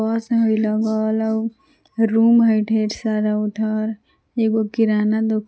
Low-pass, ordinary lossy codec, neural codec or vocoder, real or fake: none; none; none; real